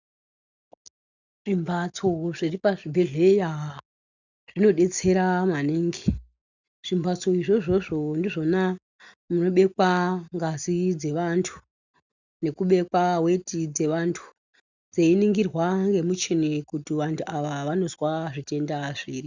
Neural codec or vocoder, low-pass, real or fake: none; 7.2 kHz; real